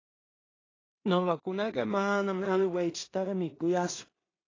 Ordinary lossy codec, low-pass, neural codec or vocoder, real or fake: AAC, 32 kbps; 7.2 kHz; codec, 16 kHz in and 24 kHz out, 0.4 kbps, LongCat-Audio-Codec, two codebook decoder; fake